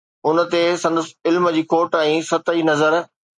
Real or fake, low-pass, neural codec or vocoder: real; 10.8 kHz; none